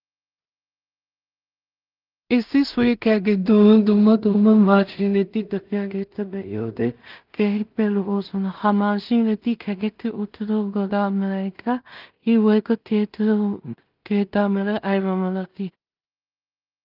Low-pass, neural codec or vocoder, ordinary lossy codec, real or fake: 5.4 kHz; codec, 16 kHz in and 24 kHz out, 0.4 kbps, LongCat-Audio-Codec, two codebook decoder; Opus, 24 kbps; fake